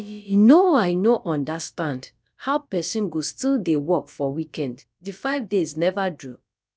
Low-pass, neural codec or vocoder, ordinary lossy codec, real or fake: none; codec, 16 kHz, about 1 kbps, DyCAST, with the encoder's durations; none; fake